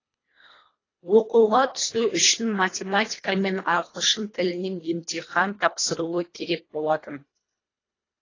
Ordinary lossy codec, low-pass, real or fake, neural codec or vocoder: AAC, 32 kbps; 7.2 kHz; fake; codec, 24 kHz, 1.5 kbps, HILCodec